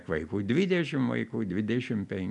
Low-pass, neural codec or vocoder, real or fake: 10.8 kHz; none; real